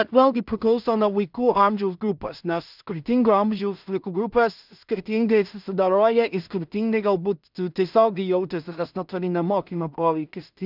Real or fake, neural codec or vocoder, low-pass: fake; codec, 16 kHz in and 24 kHz out, 0.4 kbps, LongCat-Audio-Codec, two codebook decoder; 5.4 kHz